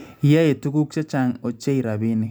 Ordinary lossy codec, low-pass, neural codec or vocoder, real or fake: none; none; none; real